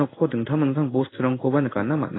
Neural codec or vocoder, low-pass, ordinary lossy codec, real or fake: codec, 16 kHz in and 24 kHz out, 1 kbps, XY-Tokenizer; 7.2 kHz; AAC, 16 kbps; fake